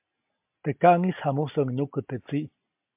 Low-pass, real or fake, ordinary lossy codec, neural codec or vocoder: 3.6 kHz; real; MP3, 32 kbps; none